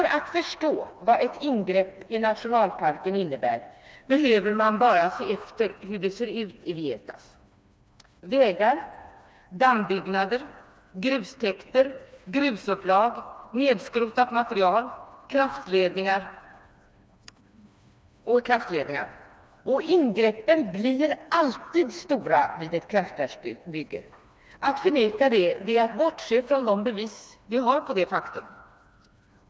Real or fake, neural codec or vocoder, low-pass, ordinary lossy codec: fake; codec, 16 kHz, 2 kbps, FreqCodec, smaller model; none; none